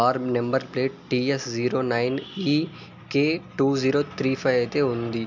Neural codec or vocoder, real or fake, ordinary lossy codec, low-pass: none; real; MP3, 64 kbps; 7.2 kHz